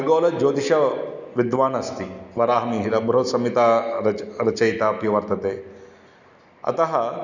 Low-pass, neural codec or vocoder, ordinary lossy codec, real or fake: 7.2 kHz; none; none; real